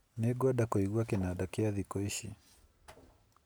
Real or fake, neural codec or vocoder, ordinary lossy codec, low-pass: real; none; none; none